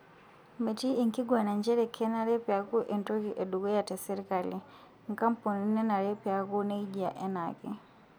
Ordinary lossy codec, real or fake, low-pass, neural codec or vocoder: none; real; none; none